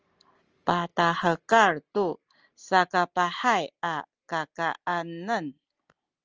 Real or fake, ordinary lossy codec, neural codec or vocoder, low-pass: real; Opus, 24 kbps; none; 7.2 kHz